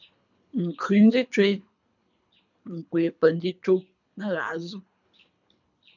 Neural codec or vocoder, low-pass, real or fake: codec, 24 kHz, 3 kbps, HILCodec; 7.2 kHz; fake